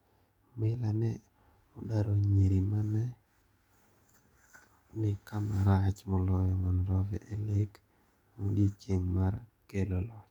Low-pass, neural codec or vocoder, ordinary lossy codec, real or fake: 19.8 kHz; codec, 44.1 kHz, 7.8 kbps, DAC; MP3, 96 kbps; fake